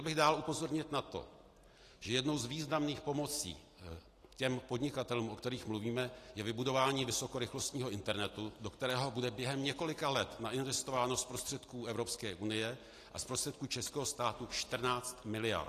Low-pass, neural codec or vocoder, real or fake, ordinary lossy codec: 14.4 kHz; none; real; AAC, 48 kbps